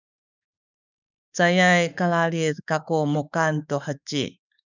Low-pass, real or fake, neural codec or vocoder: 7.2 kHz; fake; autoencoder, 48 kHz, 32 numbers a frame, DAC-VAE, trained on Japanese speech